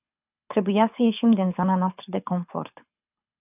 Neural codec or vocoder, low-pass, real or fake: codec, 24 kHz, 6 kbps, HILCodec; 3.6 kHz; fake